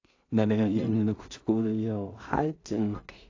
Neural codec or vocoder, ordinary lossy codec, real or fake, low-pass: codec, 16 kHz in and 24 kHz out, 0.4 kbps, LongCat-Audio-Codec, two codebook decoder; AAC, 48 kbps; fake; 7.2 kHz